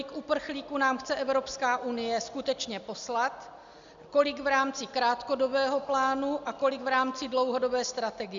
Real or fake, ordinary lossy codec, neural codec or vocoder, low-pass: real; Opus, 64 kbps; none; 7.2 kHz